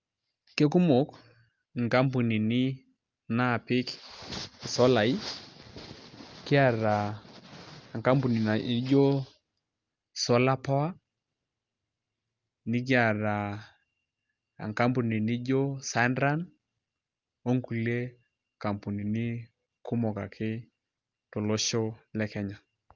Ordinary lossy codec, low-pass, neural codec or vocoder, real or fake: Opus, 24 kbps; 7.2 kHz; none; real